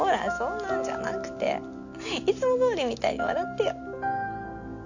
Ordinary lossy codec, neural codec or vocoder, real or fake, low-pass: none; none; real; 7.2 kHz